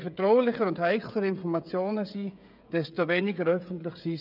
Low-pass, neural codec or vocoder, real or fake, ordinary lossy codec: 5.4 kHz; codec, 16 kHz, 16 kbps, FreqCodec, smaller model; fake; none